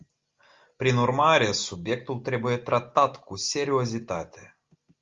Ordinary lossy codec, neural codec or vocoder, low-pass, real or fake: Opus, 32 kbps; none; 7.2 kHz; real